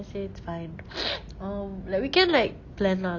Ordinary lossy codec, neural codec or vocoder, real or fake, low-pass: none; none; real; 7.2 kHz